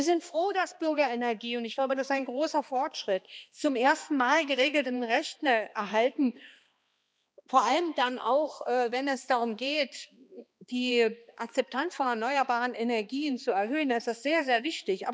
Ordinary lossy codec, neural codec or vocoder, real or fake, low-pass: none; codec, 16 kHz, 2 kbps, X-Codec, HuBERT features, trained on balanced general audio; fake; none